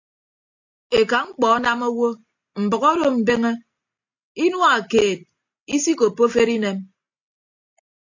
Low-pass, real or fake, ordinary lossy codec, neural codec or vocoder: 7.2 kHz; real; AAC, 48 kbps; none